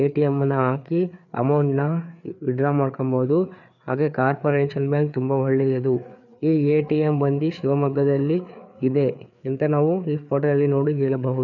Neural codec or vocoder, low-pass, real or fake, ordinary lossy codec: codec, 16 kHz, 4 kbps, FreqCodec, larger model; 7.2 kHz; fake; none